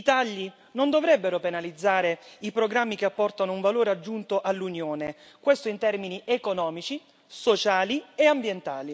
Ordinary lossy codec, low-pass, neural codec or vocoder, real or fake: none; none; none; real